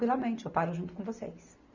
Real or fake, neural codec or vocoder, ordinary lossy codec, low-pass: real; none; none; 7.2 kHz